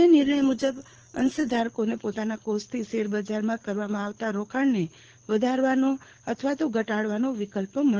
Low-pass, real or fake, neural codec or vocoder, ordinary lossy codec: 7.2 kHz; fake; codec, 24 kHz, 6 kbps, HILCodec; Opus, 16 kbps